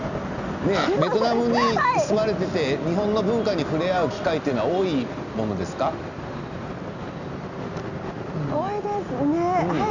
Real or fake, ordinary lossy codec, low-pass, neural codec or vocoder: real; none; 7.2 kHz; none